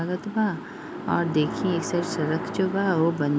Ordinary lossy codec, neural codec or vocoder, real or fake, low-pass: none; none; real; none